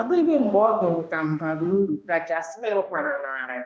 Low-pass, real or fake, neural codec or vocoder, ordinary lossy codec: none; fake; codec, 16 kHz, 1 kbps, X-Codec, HuBERT features, trained on balanced general audio; none